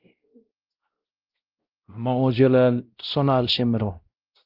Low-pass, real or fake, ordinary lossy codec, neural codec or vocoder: 5.4 kHz; fake; Opus, 32 kbps; codec, 16 kHz, 0.5 kbps, X-Codec, WavLM features, trained on Multilingual LibriSpeech